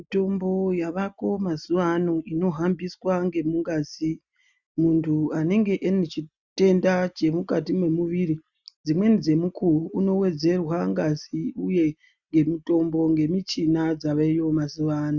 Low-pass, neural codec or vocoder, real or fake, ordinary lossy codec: 7.2 kHz; none; real; Opus, 64 kbps